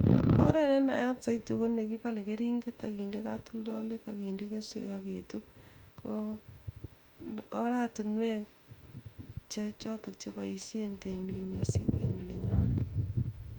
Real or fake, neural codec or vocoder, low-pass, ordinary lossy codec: fake; autoencoder, 48 kHz, 32 numbers a frame, DAC-VAE, trained on Japanese speech; 19.8 kHz; Opus, 64 kbps